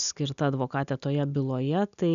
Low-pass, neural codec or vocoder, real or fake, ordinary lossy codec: 7.2 kHz; none; real; MP3, 96 kbps